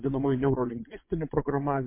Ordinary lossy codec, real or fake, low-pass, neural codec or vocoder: MP3, 24 kbps; fake; 3.6 kHz; vocoder, 22.05 kHz, 80 mel bands, WaveNeXt